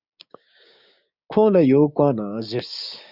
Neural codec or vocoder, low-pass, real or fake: codec, 16 kHz, 6 kbps, DAC; 5.4 kHz; fake